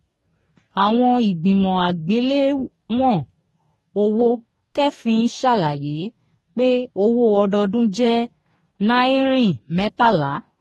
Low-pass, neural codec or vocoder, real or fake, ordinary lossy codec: 19.8 kHz; codec, 44.1 kHz, 2.6 kbps, DAC; fake; AAC, 32 kbps